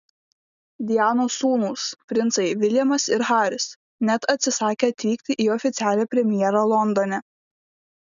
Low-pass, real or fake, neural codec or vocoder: 7.2 kHz; real; none